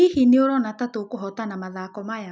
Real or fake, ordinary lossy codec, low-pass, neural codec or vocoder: real; none; none; none